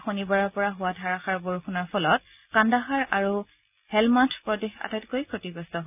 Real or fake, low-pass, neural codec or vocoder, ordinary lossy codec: real; 3.6 kHz; none; none